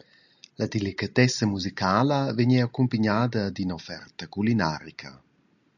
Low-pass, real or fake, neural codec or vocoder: 7.2 kHz; real; none